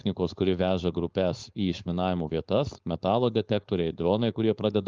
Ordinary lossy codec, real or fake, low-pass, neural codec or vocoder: Opus, 32 kbps; fake; 7.2 kHz; codec, 16 kHz, 4.8 kbps, FACodec